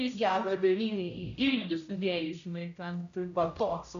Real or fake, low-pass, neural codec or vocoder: fake; 7.2 kHz; codec, 16 kHz, 0.5 kbps, X-Codec, HuBERT features, trained on general audio